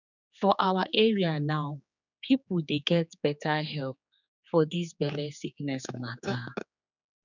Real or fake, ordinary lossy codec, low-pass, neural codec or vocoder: fake; none; 7.2 kHz; codec, 16 kHz, 4 kbps, X-Codec, HuBERT features, trained on general audio